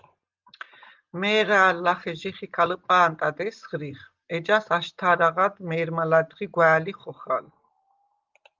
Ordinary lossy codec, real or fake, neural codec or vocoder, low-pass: Opus, 32 kbps; real; none; 7.2 kHz